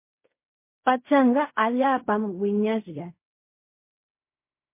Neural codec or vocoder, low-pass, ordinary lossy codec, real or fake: codec, 16 kHz in and 24 kHz out, 0.4 kbps, LongCat-Audio-Codec, fine tuned four codebook decoder; 3.6 kHz; MP3, 24 kbps; fake